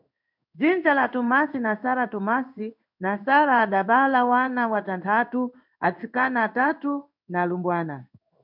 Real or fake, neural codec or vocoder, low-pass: fake; codec, 16 kHz in and 24 kHz out, 1 kbps, XY-Tokenizer; 5.4 kHz